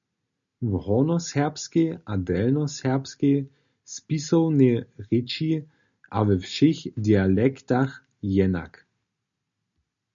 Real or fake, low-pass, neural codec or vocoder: real; 7.2 kHz; none